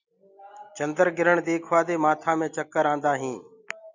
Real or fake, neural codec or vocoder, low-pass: real; none; 7.2 kHz